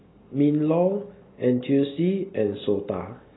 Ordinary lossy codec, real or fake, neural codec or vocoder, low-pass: AAC, 16 kbps; real; none; 7.2 kHz